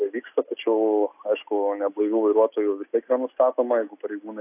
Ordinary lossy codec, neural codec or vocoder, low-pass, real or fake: AAC, 32 kbps; none; 3.6 kHz; real